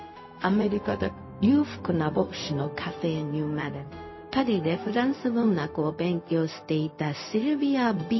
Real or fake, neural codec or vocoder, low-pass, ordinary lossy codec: fake; codec, 16 kHz, 0.4 kbps, LongCat-Audio-Codec; 7.2 kHz; MP3, 24 kbps